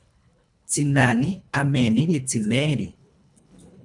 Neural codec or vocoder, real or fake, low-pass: codec, 24 kHz, 1.5 kbps, HILCodec; fake; 10.8 kHz